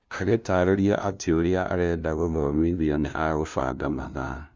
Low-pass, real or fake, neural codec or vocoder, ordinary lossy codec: none; fake; codec, 16 kHz, 0.5 kbps, FunCodec, trained on LibriTTS, 25 frames a second; none